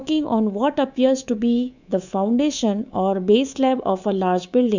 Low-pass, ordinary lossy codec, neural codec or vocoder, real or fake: 7.2 kHz; none; codec, 24 kHz, 3.1 kbps, DualCodec; fake